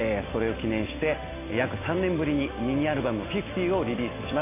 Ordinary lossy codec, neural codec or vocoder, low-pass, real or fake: AAC, 16 kbps; none; 3.6 kHz; real